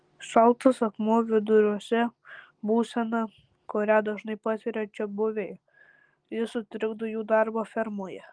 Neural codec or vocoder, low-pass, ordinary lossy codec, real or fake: none; 9.9 kHz; Opus, 24 kbps; real